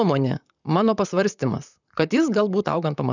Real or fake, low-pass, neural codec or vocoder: fake; 7.2 kHz; vocoder, 44.1 kHz, 128 mel bands, Pupu-Vocoder